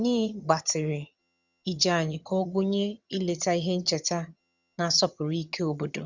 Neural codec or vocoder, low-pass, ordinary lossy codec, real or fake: vocoder, 22.05 kHz, 80 mel bands, HiFi-GAN; 7.2 kHz; Opus, 64 kbps; fake